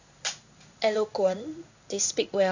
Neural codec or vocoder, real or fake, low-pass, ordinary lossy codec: codec, 16 kHz in and 24 kHz out, 1 kbps, XY-Tokenizer; fake; 7.2 kHz; none